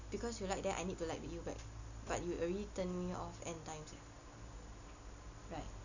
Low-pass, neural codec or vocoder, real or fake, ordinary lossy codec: 7.2 kHz; none; real; none